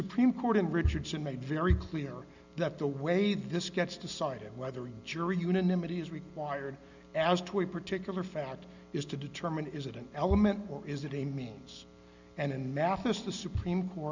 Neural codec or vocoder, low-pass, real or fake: none; 7.2 kHz; real